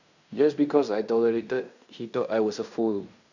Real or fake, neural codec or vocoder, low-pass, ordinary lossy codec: fake; codec, 16 kHz in and 24 kHz out, 0.9 kbps, LongCat-Audio-Codec, fine tuned four codebook decoder; 7.2 kHz; none